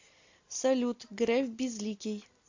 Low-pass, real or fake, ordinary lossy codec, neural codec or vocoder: 7.2 kHz; real; AAC, 48 kbps; none